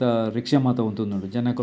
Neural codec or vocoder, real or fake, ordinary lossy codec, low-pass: none; real; none; none